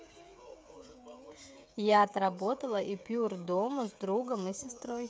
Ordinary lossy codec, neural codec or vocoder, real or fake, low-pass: none; codec, 16 kHz, 16 kbps, FreqCodec, smaller model; fake; none